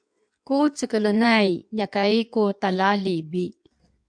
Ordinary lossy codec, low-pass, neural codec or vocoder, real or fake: MP3, 96 kbps; 9.9 kHz; codec, 16 kHz in and 24 kHz out, 1.1 kbps, FireRedTTS-2 codec; fake